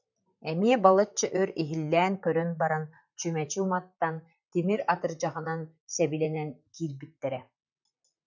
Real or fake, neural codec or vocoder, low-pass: fake; vocoder, 44.1 kHz, 128 mel bands, Pupu-Vocoder; 7.2 kHz